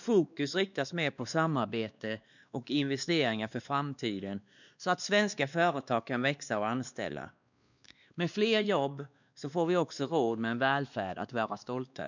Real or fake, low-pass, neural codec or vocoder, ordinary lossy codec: fake; 7.2 kHz; codec, 16 kHz, 2 kbps, X-Codec, WavLM features, trained on Multilingual LibriSpeech; none